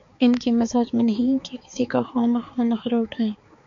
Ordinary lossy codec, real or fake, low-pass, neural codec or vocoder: MP3, 48 kbps; fake; 7.2 kHz; codec, 16 kHz, 4 kbps, X-Codec, HuBERT features, trained on balanced general audio